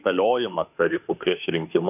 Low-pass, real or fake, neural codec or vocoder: 3.6 kHz; fake; autoencoder, 48 kHz, 32 numbers a frame, DAC-VAE, trained on Japanese speech